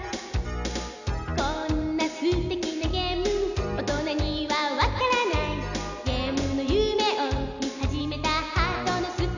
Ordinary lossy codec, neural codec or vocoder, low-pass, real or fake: none; none; 7.2 kHz; real